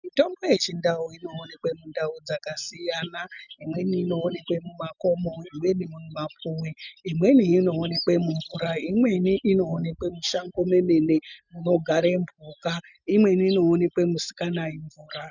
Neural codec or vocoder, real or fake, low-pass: none; real; 7.2 kHz